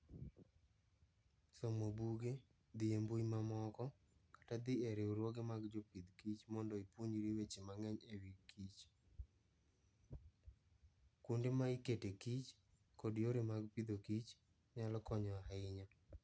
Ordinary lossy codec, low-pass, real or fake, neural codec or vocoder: none; none; real; none